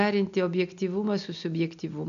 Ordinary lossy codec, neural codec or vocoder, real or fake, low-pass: MP3, 96 kbps; none; real; 7.2 kHz